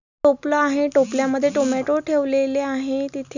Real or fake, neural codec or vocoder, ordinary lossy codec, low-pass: real; none; none; 7.2 kHz